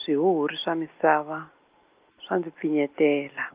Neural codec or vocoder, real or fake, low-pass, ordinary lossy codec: none; real; 3.6 kHz; Opus, 24 kbps